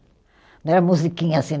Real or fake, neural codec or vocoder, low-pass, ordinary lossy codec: real; none; none; none